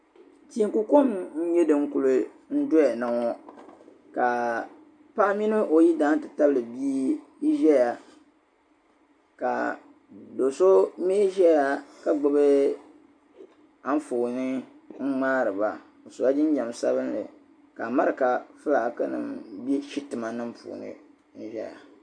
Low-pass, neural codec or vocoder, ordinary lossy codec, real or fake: 9.9 kHz; none; AAC, 64 kbps; real